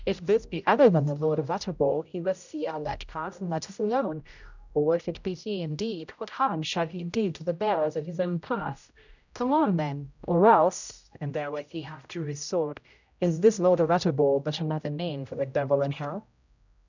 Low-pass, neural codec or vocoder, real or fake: 7.2 kHz; codec, 16 kHz, 0.5 kbps, X-Codec, HuBERT features, trained on general audio; fake